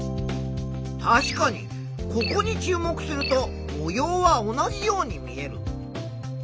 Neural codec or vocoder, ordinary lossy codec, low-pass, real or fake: none; none; none; real